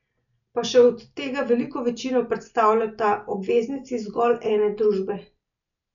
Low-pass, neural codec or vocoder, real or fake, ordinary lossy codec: 7.2 kHz; none; real; none